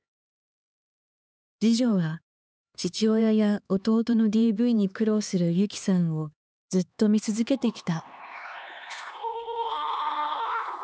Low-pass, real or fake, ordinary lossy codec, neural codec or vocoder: none; fake; none; codec, 16 kHz, 2 kbps, X-Codec, HuBERT features, trained on LibriSpeech